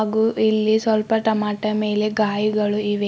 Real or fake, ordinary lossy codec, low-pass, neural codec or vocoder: real; none; none; none